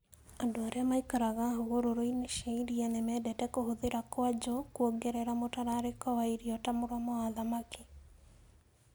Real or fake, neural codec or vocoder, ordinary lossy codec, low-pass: real; none; none; none